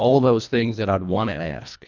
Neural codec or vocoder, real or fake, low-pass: codec, 24 kHz, 1.5 kbps, HILCodec; fake; 7.2 kHz